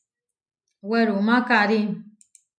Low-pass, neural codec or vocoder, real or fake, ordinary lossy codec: 9.9 kHz; none; real; MP3, 96 kbps